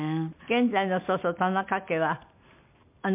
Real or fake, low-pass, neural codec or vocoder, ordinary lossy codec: real; 3.6 kHz; none; MP3, 32 kbps